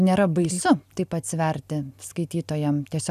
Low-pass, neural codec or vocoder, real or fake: 14.4 kHz; none; real